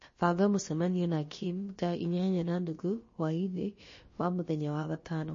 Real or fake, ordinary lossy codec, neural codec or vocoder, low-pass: fake; MP3, 32 kbps; codec, 16 kHz, about 1 kbps, DyCAST, with the encoder's durations; 7.2 kHz